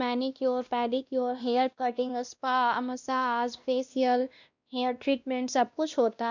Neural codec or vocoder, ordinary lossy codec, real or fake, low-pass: codec, 16 kHz, 1 kbps, X-Codec, WavLM features, trained on Multilingual LibriSpeech; none; fake; 7.2 kHz